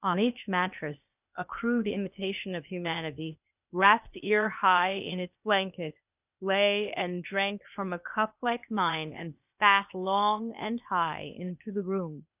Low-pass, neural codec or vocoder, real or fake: 3.6 kHz; codec, 16 kHz, 0.8 kbps, ZipCodec; fake